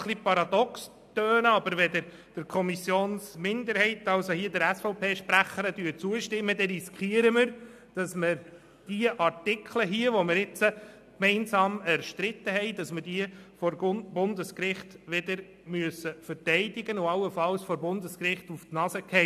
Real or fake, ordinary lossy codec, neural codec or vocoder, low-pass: real; AAC, 96 kbps; none; 14.4 kHz